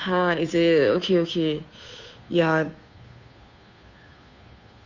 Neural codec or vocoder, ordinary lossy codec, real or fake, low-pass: codec, 16 kHz, 2 kbps, FunCodec, trained on Chinese and English, 25 frames a second; none; fake; 7.2 kHz